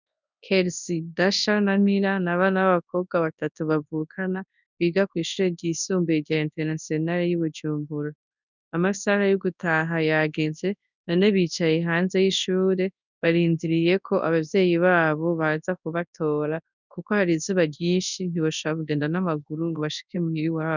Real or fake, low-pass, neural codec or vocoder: fake; 7.2 kHz; codec, 24 kHz, 0.9 kbps, WavTokenizer, large speech release